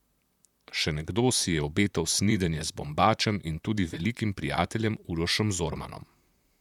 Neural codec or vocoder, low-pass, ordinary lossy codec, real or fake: vocoder, 44.1 kHz, 128 mel bands, Pupu-Vocoder; 19.8 kHz; none; fake